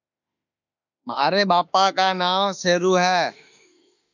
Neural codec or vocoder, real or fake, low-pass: autoencoder, 48 kHz, 32 numbers a frame, DAC-VAE, trained on Japanese speech; fake; 7.2 kHz